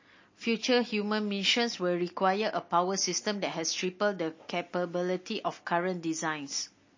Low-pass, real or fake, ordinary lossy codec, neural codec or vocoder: 7.2 kHz; real; MP3, 32 kbps; none